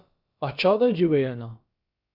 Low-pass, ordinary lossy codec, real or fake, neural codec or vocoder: 5.4 kHz; Opus, 64 kbps; fake; codec, 16 kHz, about 1 kbps, DyCAST, with the encoder's durations